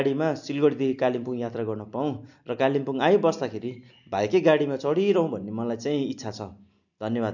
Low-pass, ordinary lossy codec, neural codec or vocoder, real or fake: 7.2 kHz; none; none; real